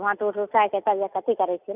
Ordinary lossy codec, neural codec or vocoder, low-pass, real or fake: none; none; 3.6 kHz; real